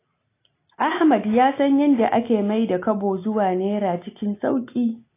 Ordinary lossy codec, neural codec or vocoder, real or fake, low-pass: AAC, 24 kbps; none; real; 3.6 kHz